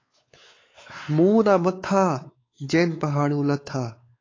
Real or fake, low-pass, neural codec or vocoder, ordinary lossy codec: fake; 7.2 kHz; codec, 16 kHz, 4 kbps, X-Codec, WavLM features, trained on Multilingual LibriSpeech; AAC, 32 kbps